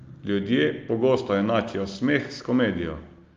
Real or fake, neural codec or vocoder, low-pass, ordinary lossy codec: real; none; 7.2 kHz; Opus, 32 kbps